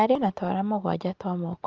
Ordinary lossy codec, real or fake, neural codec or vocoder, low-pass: Opus, 24 kbps; real; none; 7.2 kHz